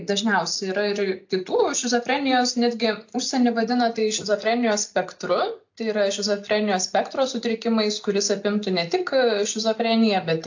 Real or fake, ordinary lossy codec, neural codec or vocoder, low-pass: real; AAC, 48 kbps; none; 7.2 kHz